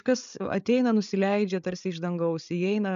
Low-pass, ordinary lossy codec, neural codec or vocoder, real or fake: 7.2 kHz; MP3, 96 kbps; codec, 16 kHz, 8 kbps, FreqCodec, larger model; fake